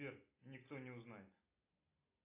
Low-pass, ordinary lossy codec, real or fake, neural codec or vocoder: 3.6 kHz; AAC, 32 kbps; real; none